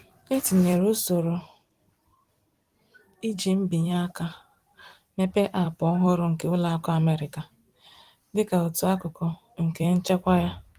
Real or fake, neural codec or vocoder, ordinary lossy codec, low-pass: fake; vocoder, 44.1 kHz, 128 mel bands every 256 samples, BigVGAN v2; Opus, 24 kbps; 14.4 kHz